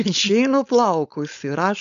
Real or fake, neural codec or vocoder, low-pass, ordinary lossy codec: fake; codec, 16 kHz, 4.8 kbps, FACodec; 7.2 kHz; MP3, 96 kbps